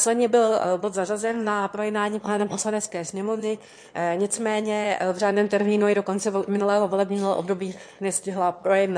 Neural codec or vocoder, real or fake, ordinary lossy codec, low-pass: autoencoder, 22.05 kHz, a latent of 192 numbers a frame, VITS, trained on one speaker; fake; MP3, 48 kbps; 9.9 kHz